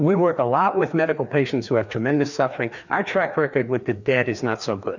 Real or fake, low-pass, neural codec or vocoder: fake; 7.2 kHz; codec, 16 kHz, 2 kbps, FreqCodec, larger model